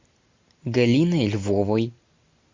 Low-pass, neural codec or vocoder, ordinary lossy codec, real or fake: 7.2 kHz; none; MP3, 48 kbps; real